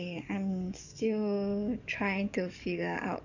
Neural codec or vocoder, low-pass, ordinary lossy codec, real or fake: codec, 44.1 kHz, 7.8 kbps, DAC; 7.2 kHz; none; fake